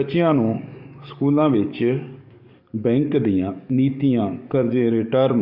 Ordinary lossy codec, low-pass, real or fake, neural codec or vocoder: none; 5.4 kHz; fake; codec, 44.1 kHz, 7.8 kbps, DAC